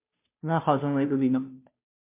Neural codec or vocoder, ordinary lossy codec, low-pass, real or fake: codec, 16 kHz, 0.5 kbps, FunCodec, trained on Chinese and English, 25 frames a second; MP3, 24 kbps; 3.6 kHz; fake